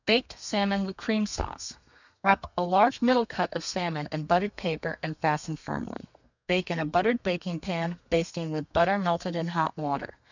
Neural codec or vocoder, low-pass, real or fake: codec, 32 kHz, 1.9 kbps, SNAC; 7.2 kHz; fake